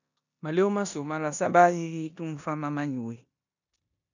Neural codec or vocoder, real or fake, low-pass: codec, 16 kHz in and 24 kHz out, 0.9 kbps, LongCat-Audio-Codec, four codebook decoder; fake; 7.2 kHz